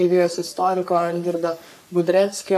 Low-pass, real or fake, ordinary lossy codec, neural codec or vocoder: 14.4 kHz; fake; AAC, 96 kbps; codec, 44.1 kHz, 3.4 kbps, Pupu-Codec